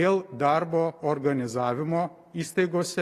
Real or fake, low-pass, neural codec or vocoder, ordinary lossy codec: real; 14.4 kHz; none; AAC, 48 kbps